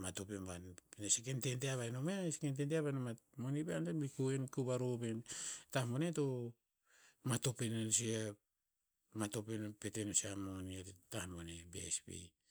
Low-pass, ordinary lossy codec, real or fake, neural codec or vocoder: none; none; real; none